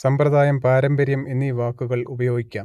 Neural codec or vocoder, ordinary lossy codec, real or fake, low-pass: none; AAC, 96 kbps; real; 14.4 kHz